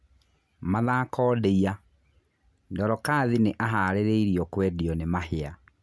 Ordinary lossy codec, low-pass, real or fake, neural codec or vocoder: none; none; real; none